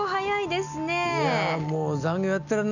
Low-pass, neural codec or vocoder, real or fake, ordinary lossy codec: 7.2 kHz; none; real; none